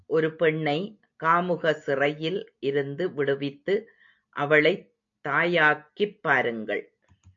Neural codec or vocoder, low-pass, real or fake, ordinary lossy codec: none; 7.2 kHz; real; AAC, 64 kbps